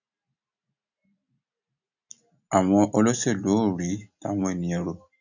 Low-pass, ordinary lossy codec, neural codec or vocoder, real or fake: 7.2 kHz; none; none; real